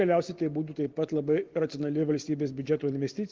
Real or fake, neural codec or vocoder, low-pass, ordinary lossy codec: real; none; 7.2 kHz; Opus, 16 kbps